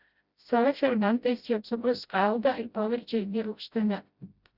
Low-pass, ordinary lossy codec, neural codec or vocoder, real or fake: 5.4 kHz; Opus, 64 kbps; codec, 16 kHz, 0.5 kbps, FreqCodec, smaller model; fake